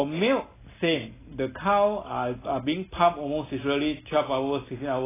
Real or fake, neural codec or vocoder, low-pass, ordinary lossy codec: real; none; 3.6 kHz; AAC, 16 kbps